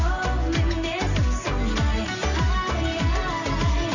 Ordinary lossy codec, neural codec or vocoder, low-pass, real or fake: none; none; 7.2 kHz; real